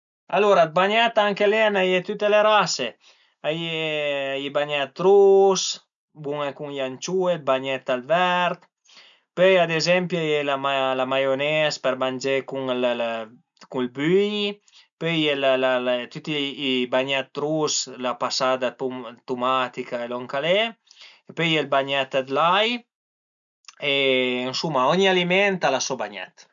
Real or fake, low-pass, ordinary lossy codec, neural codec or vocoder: real; 7.2 kHz; none; none